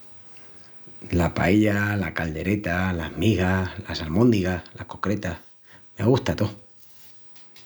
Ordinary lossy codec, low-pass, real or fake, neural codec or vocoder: none; none; real; none